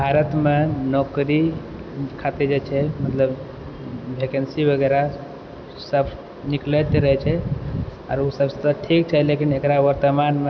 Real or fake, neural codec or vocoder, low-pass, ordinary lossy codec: real; none; none; none